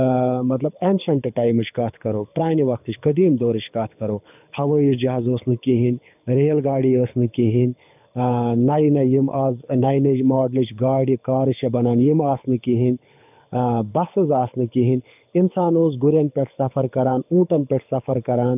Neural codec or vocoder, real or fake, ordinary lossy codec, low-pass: codec, 24 kHz, 6 kbps, HILCodec; fake; none; 3.6 kHz